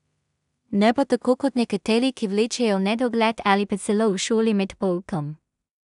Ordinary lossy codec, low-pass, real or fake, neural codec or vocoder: none; 10.8 kHz; fake; codec, 16 kHz in and 24 kHz out, 0.4 kbps, LongCat-Audio-Codec, two codebook decoder